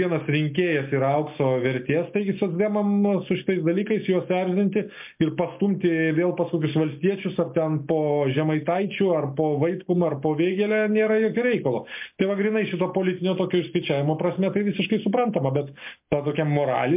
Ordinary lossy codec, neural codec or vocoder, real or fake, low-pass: MP3, 32 kbps; none; real; 3.6 kHz